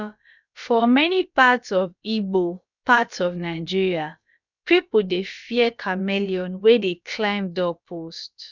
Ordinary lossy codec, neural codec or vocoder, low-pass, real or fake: none; codec, 16 kHz, about 1 kbps, DyCAST, with the encoder's durations; 7.2 kHz; fake